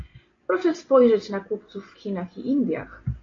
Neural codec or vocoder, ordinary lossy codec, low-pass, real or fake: none; AAC, 32 kbps; 7.2 kHz; real